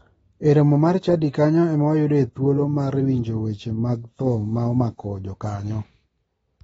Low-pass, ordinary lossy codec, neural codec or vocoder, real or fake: 19.8 kHz; AAC, 24 kbps; none; real